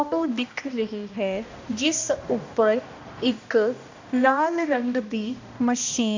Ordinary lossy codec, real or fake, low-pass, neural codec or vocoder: AAC, 48 kbps; fake; 7.2 kHz; codec, 16 kHz, 1 kbps, X-Codec, HuBERT features, trained on balanced general audio